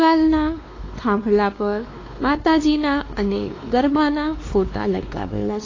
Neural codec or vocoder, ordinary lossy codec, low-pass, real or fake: codec, 16 kHz, 2 kbps, X-Codec, WavLM features, trained on Multilingual LibriSpeech; AAC, 32 kbps; 7.2 kHz; fake